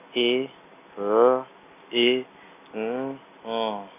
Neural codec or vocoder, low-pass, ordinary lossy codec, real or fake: none; 3.6 kHz; none; real